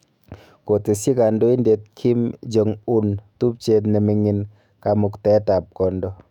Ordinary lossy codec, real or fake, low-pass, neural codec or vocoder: none; fake; 19.8 kHz; autoencoder, 48 kHz, 128 numbers a frame, DAC-VAE, trained on Japanese speech